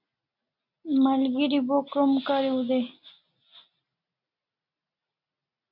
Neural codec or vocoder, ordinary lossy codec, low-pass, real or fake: none; MP3, 48 kbps; 5.4 kHz; real